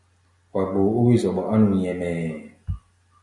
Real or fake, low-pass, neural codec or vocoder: real; 10.8 kHz; none